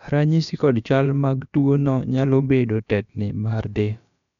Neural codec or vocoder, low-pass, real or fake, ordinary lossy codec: codec, 16 kHz, about 1 kbps, DyCAST, with the encoder's durations; 7.2 kHz; fake; none